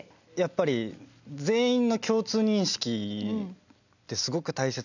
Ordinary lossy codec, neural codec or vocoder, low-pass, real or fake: none; none; 7.2 kHz; real